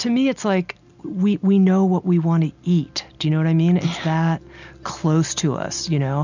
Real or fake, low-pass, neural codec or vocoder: real; 7.2 kHz; none